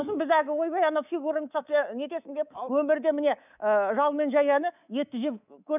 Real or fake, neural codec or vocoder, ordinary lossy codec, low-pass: fake; codec, 24 kHz, 3.1 kbps, DualCodec; none; 3.6 kHz